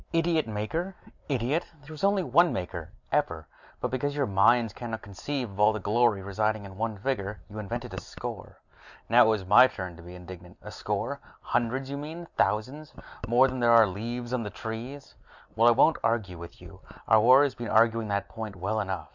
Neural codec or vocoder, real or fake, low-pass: none; real; 7.2 kHz